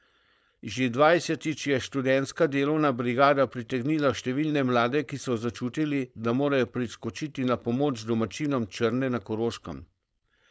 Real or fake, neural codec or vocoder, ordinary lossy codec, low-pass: fake; codec, 16 kHz, 4.8 kbps, FACodec; none; none